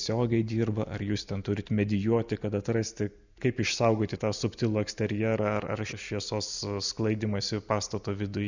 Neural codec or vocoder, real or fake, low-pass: none; real; 7.2 kHz